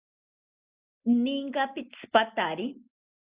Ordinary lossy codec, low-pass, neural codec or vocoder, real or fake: Opus, 64 kbps; 3.6 kHz; none; real